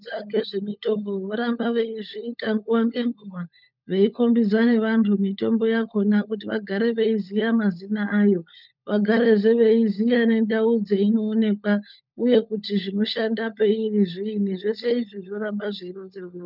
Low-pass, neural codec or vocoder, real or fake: 5.4 kHz; codec, 16 kHz, 16 kbps, FunCodec, trained on LibriTTS, 50 frames a second; fake